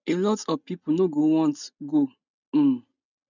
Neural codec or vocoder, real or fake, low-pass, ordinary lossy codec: none; real; 7.2 kHz; none